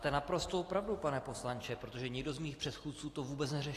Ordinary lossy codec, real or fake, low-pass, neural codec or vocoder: AAC, 48 kbps; real; 14.4 kHz; none